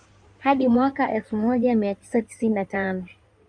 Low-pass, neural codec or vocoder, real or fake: 9.9 kHz; codec, 16 kHz in and 24 kHz out, 2.2 kbps, FireRedTTS-2 codec; fake